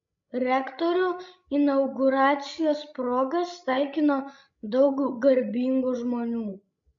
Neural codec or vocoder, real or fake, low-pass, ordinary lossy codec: codec, 16 kHz, 16 kbps, FreqCodec, larger model; fake; 7.2 kHz; MP3, 64 kbps